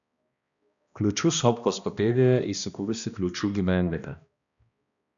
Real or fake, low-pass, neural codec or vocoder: fake; 7.2 kHz; codec, 16 kHz, 1 kbps, X-Codec, HuBERT features, trained on balanced general audio